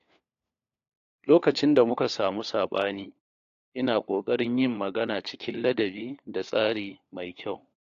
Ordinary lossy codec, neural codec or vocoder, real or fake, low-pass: AAC, 48 kbps; codec, 16 kHz, 4 kbps, FunCodec, trained on LibriTTS, 50 frames a second; fake; 7.2 kHz